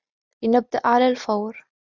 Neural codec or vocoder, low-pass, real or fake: none; 7.2 kHz; real